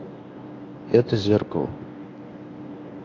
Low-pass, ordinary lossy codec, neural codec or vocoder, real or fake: 7.2 kHz; AAC, 32 kbps; codec, 24 kHz, 0.9 kbps, WavTokenizer, medium speech release version 1; fake